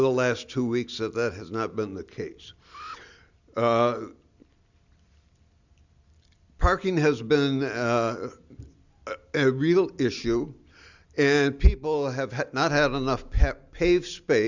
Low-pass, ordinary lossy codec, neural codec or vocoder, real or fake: 7.2 kHz; Opus, 64 kbps; none; real